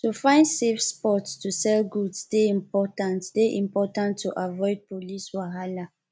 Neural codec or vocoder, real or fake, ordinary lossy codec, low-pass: none; real; none; none